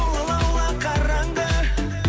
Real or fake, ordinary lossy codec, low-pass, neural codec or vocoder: real; none; none; none